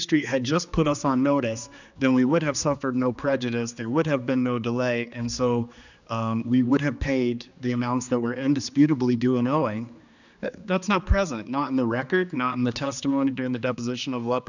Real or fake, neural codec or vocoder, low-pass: fake; codec, 16 kHz, 2 kbps, X-Codec, HuBERT features, trained on general audio; 7.2 kHz